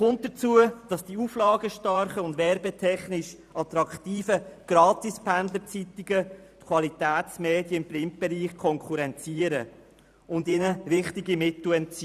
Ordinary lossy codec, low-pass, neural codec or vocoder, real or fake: none; 14.4 kHz; vocoder, 44.1 kHz, 128 mel bands every 512 samples, BigVGAN v2; fake